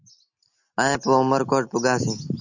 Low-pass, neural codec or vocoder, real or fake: 7.2 kHz; none; real